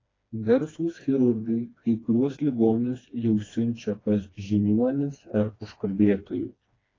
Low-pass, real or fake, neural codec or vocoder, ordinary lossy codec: 7.2 kHz; fake; codec, 16 kHz, 2 kbps, FreqCodec, smaller model; AAC, 32 kbps